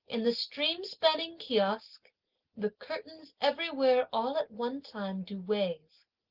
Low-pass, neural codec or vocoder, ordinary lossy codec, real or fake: 5.4 kHz; none; Opus, 16 kbps; real